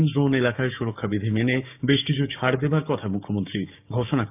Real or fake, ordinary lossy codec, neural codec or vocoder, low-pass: fake; none; codec, 16 kHz, 6 kbps, DAC; 3.6 kHz